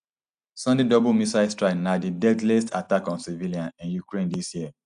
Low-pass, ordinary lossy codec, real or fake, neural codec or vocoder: 9.9 kHz; none; real; none